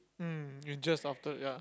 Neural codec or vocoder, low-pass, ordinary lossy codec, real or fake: none; none; none; real